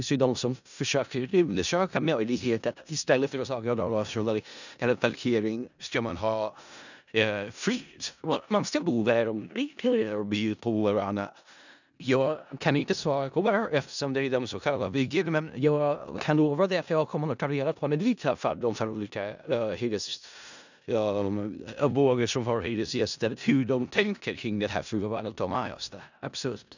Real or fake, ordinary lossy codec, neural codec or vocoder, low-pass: fake; none; codec, 16 kHz in and 24 kHz out, 0.4 kbps, LongCat-Audio-Codec, four codebook decoder; 7.2 kHz